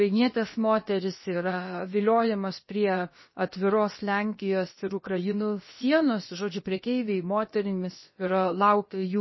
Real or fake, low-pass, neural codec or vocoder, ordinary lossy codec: fake; 7.2 kHz; codec, 16 kHz, about 1 kbps, DyCAST, with the encoder's durations; MP3, 24 kbps